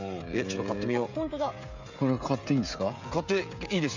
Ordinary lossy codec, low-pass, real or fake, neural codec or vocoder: none; 7.2 kHz; fake; codec, 16 kHz, 16 kbps, FreqCodec, smaller model